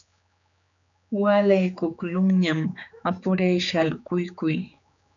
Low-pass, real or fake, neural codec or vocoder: 7.2 kHz; fake; codec, 16 kHz, 4 kbps, X-Codec, HuBERT features, trained on general audio